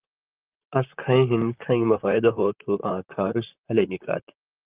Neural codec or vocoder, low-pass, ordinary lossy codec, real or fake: vocoder, 44.1 kHz, 128 mel bands, Pupu-Vocoder; 3.6 kHz; Opus, 32 kbps; fake